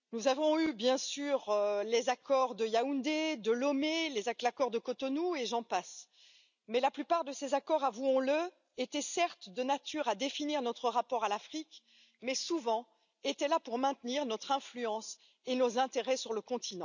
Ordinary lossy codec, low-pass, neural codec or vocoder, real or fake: none; 7.2 kHz; none; real